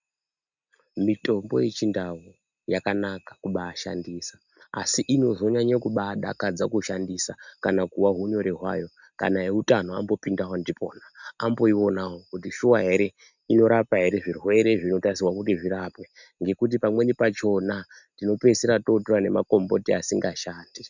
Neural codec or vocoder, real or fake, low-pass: none; real; 7.2 kHz